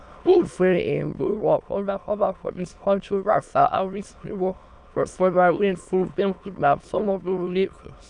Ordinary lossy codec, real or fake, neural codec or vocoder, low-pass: none; fake; autoencoder, 22.05 kHz, a latent of 192 numbers a frame, VITS, trained on many speakers; 9.9 kHz